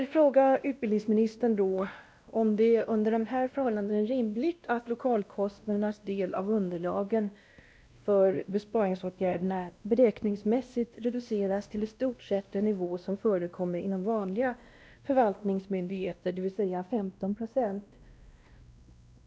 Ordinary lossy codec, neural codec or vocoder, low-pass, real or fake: none; codec, 16 kHz, 1 kbps, X-Codec, WavLM features, trained on Multilingual LibriSpeech; none; fake